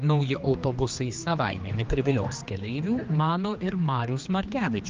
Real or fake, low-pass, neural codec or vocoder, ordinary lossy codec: fake; 7.2 kHz; codec, 16 kHz, 2 kbps, X-Codec, HuBERT features, trained on general audio; Opus, 24 kbps